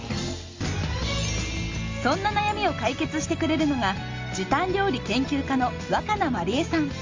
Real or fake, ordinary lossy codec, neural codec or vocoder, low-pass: real; Opus, 32 kbps; none; 7.2 kHz